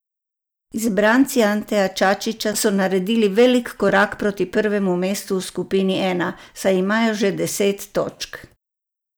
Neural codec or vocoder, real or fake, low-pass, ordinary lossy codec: none; real; none; none